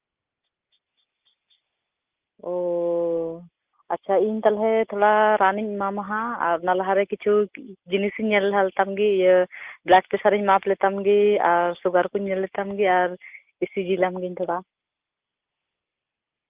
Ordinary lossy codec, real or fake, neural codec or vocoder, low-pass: Opus, 32 kbps; real; none; 3.6 kHz